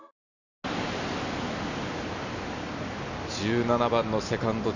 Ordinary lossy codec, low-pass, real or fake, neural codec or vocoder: none; 7.2 kHz; real; none